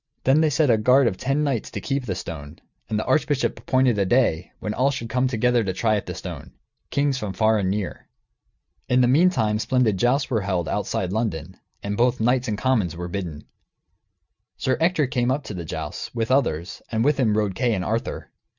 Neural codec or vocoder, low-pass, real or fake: none; 7.2 kHz; real